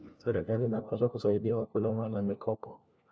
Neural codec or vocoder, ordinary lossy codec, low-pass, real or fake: codec, 16 kHz, 1 kbps, FunCodec, trained on LibriTTS, 50 frames a second; none; none; fake